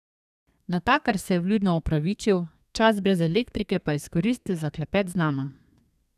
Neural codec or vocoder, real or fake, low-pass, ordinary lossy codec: codec, 32 kHz, 1.9 kbps, SNAC; fake; 14.4 kHz; none